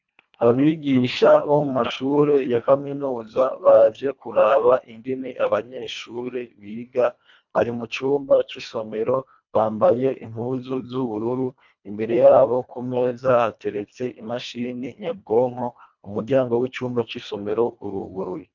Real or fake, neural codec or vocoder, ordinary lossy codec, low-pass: fake; codec, 24 kHz, 1.5 kbps, HILCodec; MP3, 64 kbps; 7.2 kHz